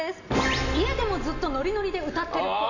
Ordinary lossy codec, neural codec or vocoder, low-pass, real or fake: none; none; 7.2 kHz; real